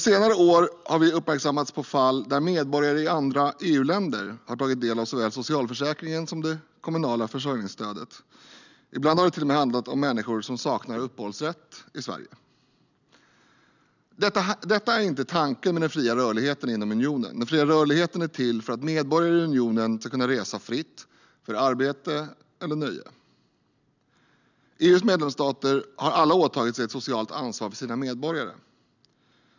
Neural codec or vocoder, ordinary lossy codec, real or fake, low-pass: none; none; real; 7.2 kHz